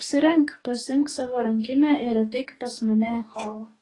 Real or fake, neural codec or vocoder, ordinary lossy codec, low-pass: fake; codec, 44.1 kHz, 2.6 kbps, DAC; AAC, 32 kbps; 10.8 kHz